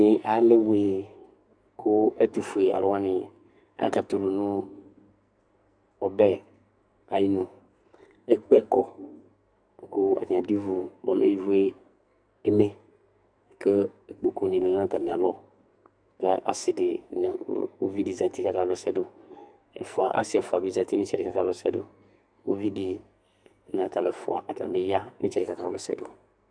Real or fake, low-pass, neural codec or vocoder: fake; 9.9 kHz; codec, 44.1 kHz, 2.6 kbps, SNAC